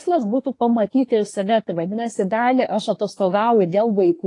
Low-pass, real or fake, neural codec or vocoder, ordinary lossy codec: 10.8 kHz; fake; codec, 24 kHz, 1 kbps, SNAC; AAC, 48 kbps